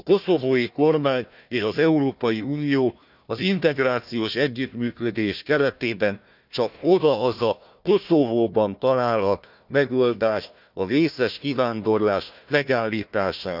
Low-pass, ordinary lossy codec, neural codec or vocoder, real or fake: 5.4 kHz; none; codec, 16 kHz, 1 kbps, FunCodec, trained on Chinese and English, 50 frames a second; fake